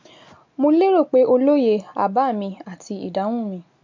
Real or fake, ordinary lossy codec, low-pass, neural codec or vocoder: real; MP3, 48 kbps; 7.2 kHz; none